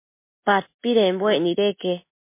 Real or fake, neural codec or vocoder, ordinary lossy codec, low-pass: real; none; MP3, 24 kbps; 3.6 kHz